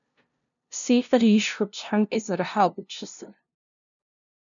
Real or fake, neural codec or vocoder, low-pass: fake; codec, 16 kHz, 0.5 kbps, FunCodec, trained on LibriTTS, 25 frames a second; 7.2 kHz